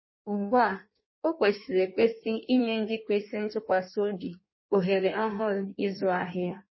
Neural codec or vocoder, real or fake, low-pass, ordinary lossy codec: codec, 16 kHz in and 24 kHz out, 1.1 kbps, FireRedTTS-2 codec; fake; 7.2 kHz; MP3, 24 kbps